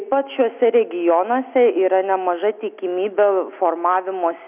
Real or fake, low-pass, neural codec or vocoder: real; 3.6 kHz; none